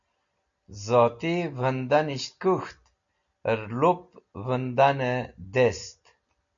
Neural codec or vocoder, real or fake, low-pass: none; real; 7.2 kHz